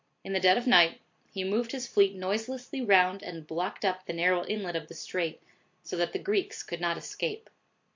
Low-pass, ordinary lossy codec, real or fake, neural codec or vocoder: 7.2 kHz; MP3, 48 kbps; real; none